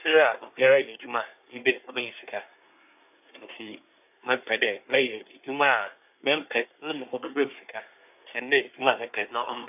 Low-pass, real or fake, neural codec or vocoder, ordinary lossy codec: 3.6 kHz; fake; codec, 24 kHz, 1 kbps, SNAC; none